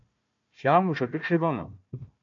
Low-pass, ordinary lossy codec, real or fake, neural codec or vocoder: 7.2 kHz; MP3, 48 kbps; fake; codec, 16 kHz, 1 kbps, FunCodec, trained on Chinese and English, 50 frames a second